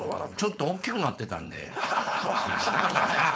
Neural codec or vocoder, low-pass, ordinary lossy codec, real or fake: codec, 16 kHz, 4.8 kbps, FACodec; none; none; fake